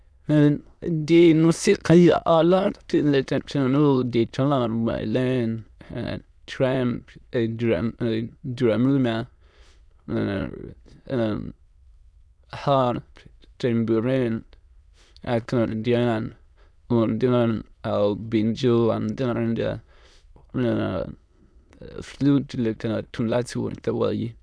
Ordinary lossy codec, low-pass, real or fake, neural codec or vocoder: none; none; fake; autoencoder, 22.05 kHz, a latent of 192 numbers a frame, VITS, trained on many speakers